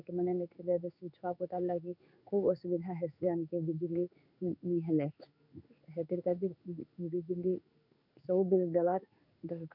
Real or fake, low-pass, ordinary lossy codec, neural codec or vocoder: fake; 5.4 kHz; none; codec, 16 kHz in and 24 kHz out, 1 kbps, XY-Tokenizer